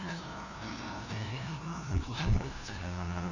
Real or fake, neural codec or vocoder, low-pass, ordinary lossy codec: fake; codec, 16 kHz, 0.5 kbps, FunCodec, trained on LibriTTS, 25 frames a second; 7.2 kHz; none